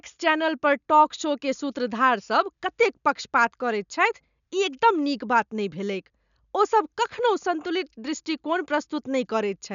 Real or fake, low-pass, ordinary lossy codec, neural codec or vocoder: real; 7.2 kHz; none; none